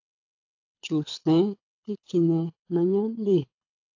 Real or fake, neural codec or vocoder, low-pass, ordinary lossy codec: fake; codec, 24 kHz, 6 kbps, HILCodec; 7.2 kHz; AAC, 48 kbps